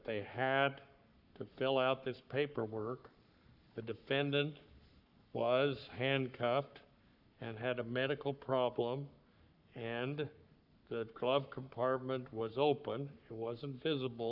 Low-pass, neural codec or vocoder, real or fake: 5.4 kHz; codec, 44.1 kHz, 7.8 kbps, Pupu-Codec; fake